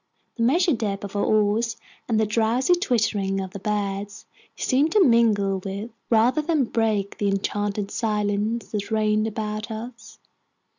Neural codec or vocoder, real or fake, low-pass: none; real; 7.2 kHz